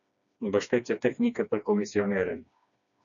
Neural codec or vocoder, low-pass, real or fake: codec, 16 kHz, 2 kbps, FreqCodec, smaller model; 7.2 kHz; fake